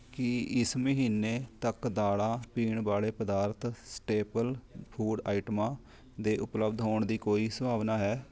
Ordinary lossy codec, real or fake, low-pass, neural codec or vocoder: none; real; none; none